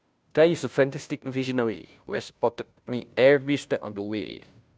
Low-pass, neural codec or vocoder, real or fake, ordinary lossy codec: none; codec, 16 kHz, 0.5 kbps, FunCodec, trained on Chinese and English, 25 frames a second; fake; none